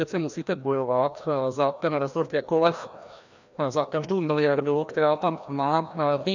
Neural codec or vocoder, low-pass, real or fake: codec, 16 kHz, 1 kbps, FreqCodec, larger model; 7.2 kHz; fake